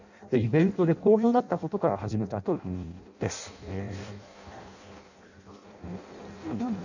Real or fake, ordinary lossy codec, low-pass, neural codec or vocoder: fake; none; 7.2 kHz; codec, 16 kHz in and 24 kHz out, 0.6 kbps, FireRedTTS-2 codec